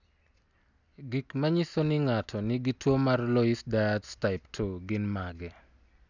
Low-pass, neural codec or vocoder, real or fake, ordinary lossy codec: 7.2 kHz; none; real; none